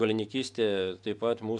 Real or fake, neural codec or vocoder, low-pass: real; none; 10.8 kHz